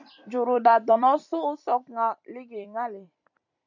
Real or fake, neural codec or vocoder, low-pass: fake; vocoder, 44.1 kHz, 128 mel bands every 256 samples, BigVGAN v2; 7.2 kHz